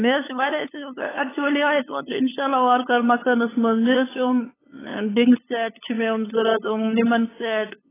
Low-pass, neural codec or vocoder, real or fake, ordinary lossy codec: 3.6 kHz; codec, 16 kHz, 8 kbps, FunCodec, trained on LibriTTS, 25 frames a second; fake; AAC, 16 kbps